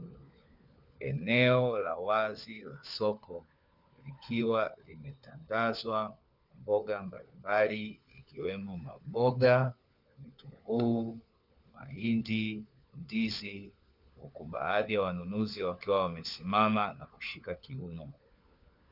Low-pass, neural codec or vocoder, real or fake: 5.4 kHz; codec, 16 kHz, 4 kbps, FunCodec, trained on LibriTTS, 50 frames a second; fake